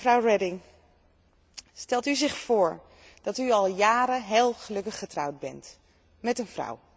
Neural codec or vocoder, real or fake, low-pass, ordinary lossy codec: none; real; none; none